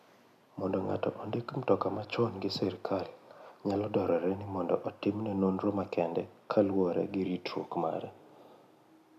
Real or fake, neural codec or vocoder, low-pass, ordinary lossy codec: real; none; 14.4 kHz; none